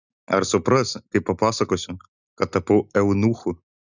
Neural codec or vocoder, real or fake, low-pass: none; real; 7.2 kHz